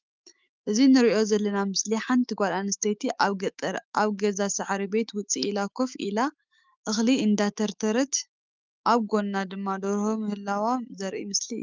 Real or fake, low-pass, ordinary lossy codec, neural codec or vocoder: fake; 7.2 kHz; Opus, 32 kbps; autoencoder, 48 kHz, 128 numbers a frame, DAC-VAE, trained on Japanese speech